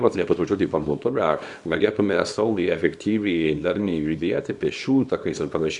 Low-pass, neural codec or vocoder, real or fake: 10.8 kHz; codec, 24 kHz, 0.9 kbps, WavTokenizer, small release; fake